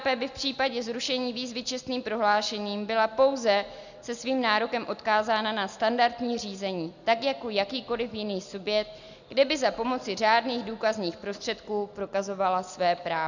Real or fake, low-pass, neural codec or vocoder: real; 7.2 kHz; none